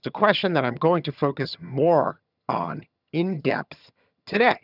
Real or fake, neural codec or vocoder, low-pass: fake; vocoder, 22.05 kHz, 80 mel bands, HiFi-GAN; 5.4 kHz